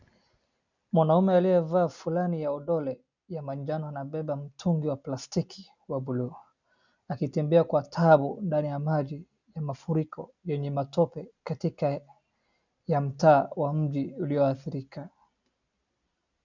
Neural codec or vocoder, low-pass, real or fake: none; 7.2 kHz; real